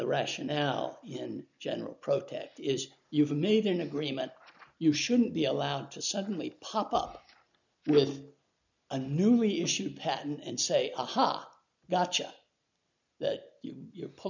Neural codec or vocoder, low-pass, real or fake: none; 7.2 kHz; real